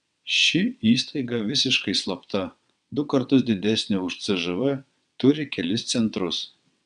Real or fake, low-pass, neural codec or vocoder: fake; 9.9 kHz; vocoder, 22.05 kHz, 80 mel bands, WaveNeXt